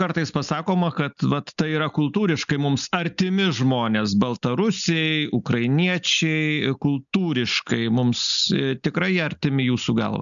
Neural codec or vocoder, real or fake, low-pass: none; real; 7.2 kHz